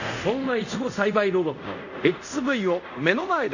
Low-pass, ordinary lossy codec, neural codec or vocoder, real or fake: 7.2 kHz; none; codec, 24 kHz, 0.5 kbps, DualCodec; fake